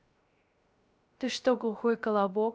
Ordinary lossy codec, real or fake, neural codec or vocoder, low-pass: none; fake; codec, 16 kHz, 0.3 kbps, FocalCodec; none